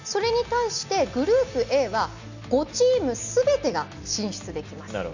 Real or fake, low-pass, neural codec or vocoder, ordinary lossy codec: real; 7.2 kHz; none; none